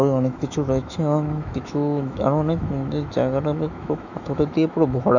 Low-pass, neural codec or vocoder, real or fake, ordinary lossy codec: 7.2 kHz; autoencoder, 48 kHz, 128 numbers a frame, DAC-VAE, trained on Japanese speech; fake; none